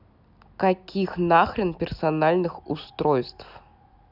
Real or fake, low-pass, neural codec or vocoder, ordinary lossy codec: real; 5.4 kHz; none; none